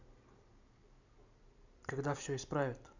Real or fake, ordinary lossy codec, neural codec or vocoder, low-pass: fake; none; vocoder, 44.1 kHz, 128 mel bands every 256 samples, BigVGAN v2; 7.2 kHz